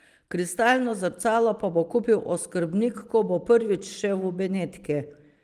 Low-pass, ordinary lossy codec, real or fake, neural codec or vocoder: 14.4 kHz; Opus, 24 kbps; fake; vocoder, 44.1 kHz, 128 mel bands every 512 samples, BigVGAN v2